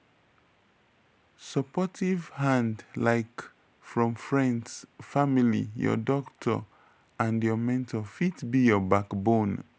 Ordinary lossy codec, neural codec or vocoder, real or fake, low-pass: none; none; real; none